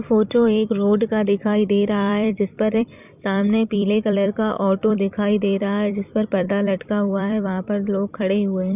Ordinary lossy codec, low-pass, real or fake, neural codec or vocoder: none; 3.6 kHz; fake; vocoder, 44.1 kHz, 128 mel bands every 256 samples, BigVGAN v2